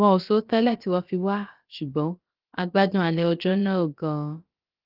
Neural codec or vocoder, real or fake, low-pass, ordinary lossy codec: codec, 16 kHz, about 1 kbps, DyCAST, with the encoder's durations; fake; 5.4 kHz; Opus, 32 kbps